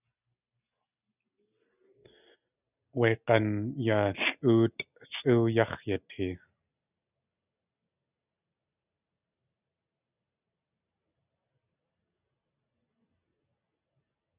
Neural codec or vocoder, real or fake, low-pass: none; real; 3.6 kHz